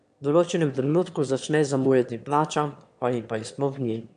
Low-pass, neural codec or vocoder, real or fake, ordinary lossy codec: 9.9 kHz; autoencoder, 22.05 kHz, a latent of 192 numbers a frame, VITS, trained on one speaker; fake; AAC, 64 kbps